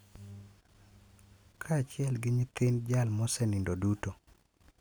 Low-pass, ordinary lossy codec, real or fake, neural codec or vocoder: none; none; real; none